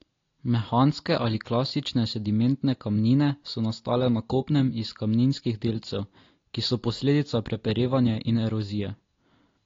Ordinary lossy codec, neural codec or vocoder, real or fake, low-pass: AAC, 32 kbps; none; real; 7.2 kHz